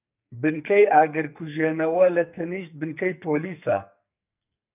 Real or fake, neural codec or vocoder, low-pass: fake; codec, 44.1 kHz, 2.6 kbps, SNAC; 3.6 kHz